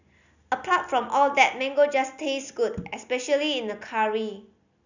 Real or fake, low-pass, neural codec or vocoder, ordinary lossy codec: real; 7.2 kHz; none; none